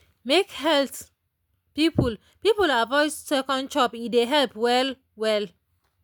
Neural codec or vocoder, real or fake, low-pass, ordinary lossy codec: none; real; none; none